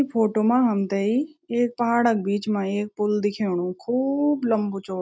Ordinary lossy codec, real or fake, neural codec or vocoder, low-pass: none; real; none; none